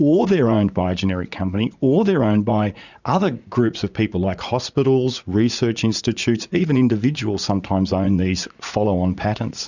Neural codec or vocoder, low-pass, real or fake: vocoder, 44.1 kHz, 80 mel bands, Vocos; 7.2 kHz; fake